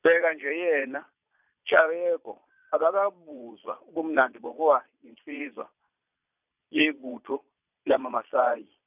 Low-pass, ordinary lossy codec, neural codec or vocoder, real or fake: 3.6 kHz; none; vocoder, 22.05 kHz, 80 mel bands, Vocos; fake